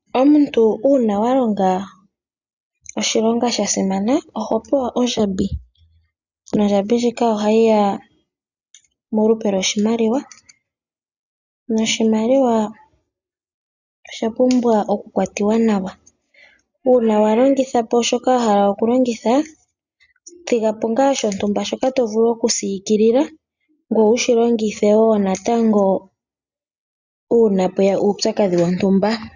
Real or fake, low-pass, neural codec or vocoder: real; 7.2 kHz; none